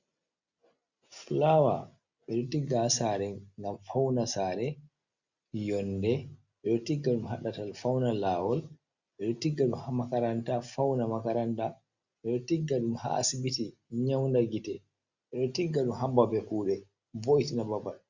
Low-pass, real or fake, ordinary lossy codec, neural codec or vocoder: 7.2 kHz; real; Opus, 64 kbps; none